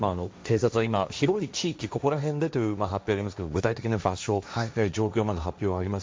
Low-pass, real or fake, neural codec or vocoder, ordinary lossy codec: none; fake; codec, 16 kHz, 1.1 kbps, Voila-Tokenizer; none